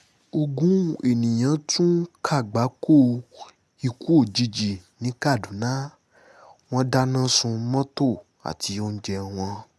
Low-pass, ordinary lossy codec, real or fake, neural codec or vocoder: none; none; real; none